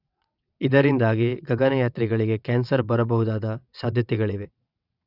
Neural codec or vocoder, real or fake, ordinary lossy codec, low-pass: vocoder, 22.05 kHz, 80 mel bands, WaveNeXt; fake; none; 5.4 kHz